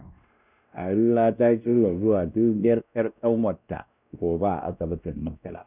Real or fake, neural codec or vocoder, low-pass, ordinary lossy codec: fake; codec, 16 kHz, 1 kbps, X-Codec, WavLM features, trained on Multilingual LibriSpeech; 3.6 kHz; none